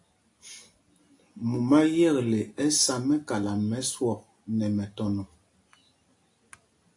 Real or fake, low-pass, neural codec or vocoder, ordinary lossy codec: real; 10.8 kHz; none; AAC, 48 kbps